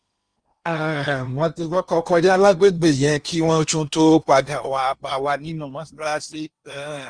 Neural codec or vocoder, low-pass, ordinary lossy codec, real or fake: codec, 16 kHz in and 24 kHz out, 0.8 kbps, FocalCodec, streaming, 65536 codes; 9.9 kHz; Opus, 32 kbps; fake